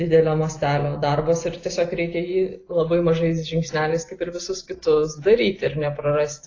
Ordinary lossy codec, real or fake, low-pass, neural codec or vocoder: AAC, 32 kbps; real; 7.2 kHz; none